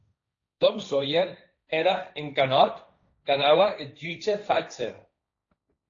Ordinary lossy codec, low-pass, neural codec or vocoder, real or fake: AAC, 48 kbps; 7.2 kHz; codec, 16 kHz, 1.1 kbps, Voila-Tokenizer; fake